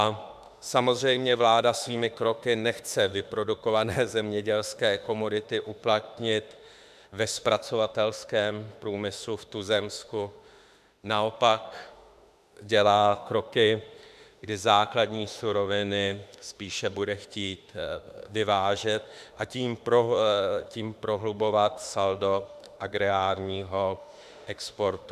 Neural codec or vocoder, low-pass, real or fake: autoencoder, 48 kHz, 32 numbers a frame, DAC-VAE, trained on Japanese speech; 14.4 kHz; fake